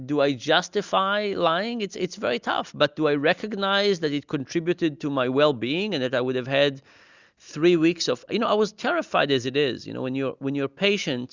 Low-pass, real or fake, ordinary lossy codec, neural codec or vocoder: 7.2 kHz; real; Opus, 64 kbps; none